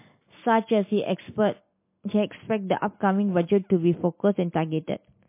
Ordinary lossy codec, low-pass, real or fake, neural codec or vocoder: MP3, 24 kbps; 3.6 kHz; real; none